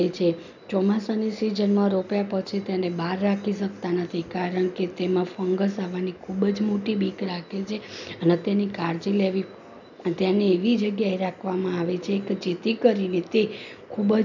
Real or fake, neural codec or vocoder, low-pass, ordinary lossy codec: real; none; 7.2 kHz; none